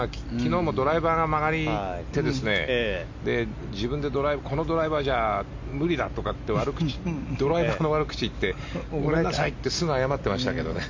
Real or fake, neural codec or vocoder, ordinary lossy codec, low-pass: real; none; MP3, 48 kbps; 7.2 kHz